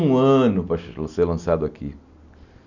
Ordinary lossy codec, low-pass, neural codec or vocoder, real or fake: none; 7.2 kHz; none; real